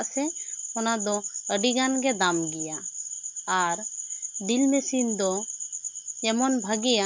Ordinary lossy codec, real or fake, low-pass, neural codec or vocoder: MP3, 64 kbps; real; 7.2 kHz; none